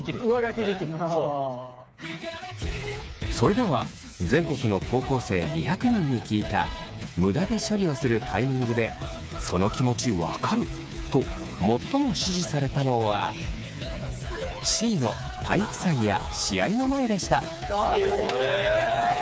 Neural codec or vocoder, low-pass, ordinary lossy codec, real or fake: codec, 16 kHz, 4 kbps, FreqCodec, smaller model; none; none; fake